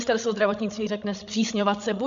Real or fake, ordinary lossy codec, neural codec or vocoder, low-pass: fake; AAC, 64 kbps; codec, 16 kHz, 16 kbps, FreqCodec, larger model; 7.2 kHz